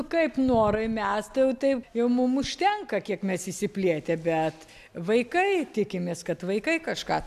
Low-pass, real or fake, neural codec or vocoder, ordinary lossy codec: 14.4 kHz; real; none; MP3, 96 kbps